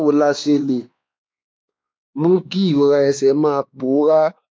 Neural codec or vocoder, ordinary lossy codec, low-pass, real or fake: codec, 16 kHz, 2 kbps, X-Codec, WavLM features, trained on Multilingual LibriSpeech; none; none; fake